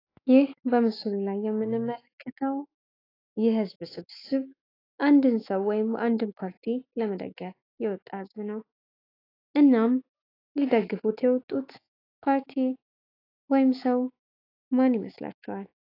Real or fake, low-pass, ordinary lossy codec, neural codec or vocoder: fake; 5.4 kHz; AAC, 24 kbps; autoencoder, 48 kHz, 128 numbers a frame, DAC-VAE, trained on Japanese speech